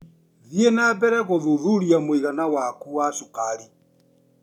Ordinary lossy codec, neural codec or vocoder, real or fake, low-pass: none; none; real; 19.8 kHz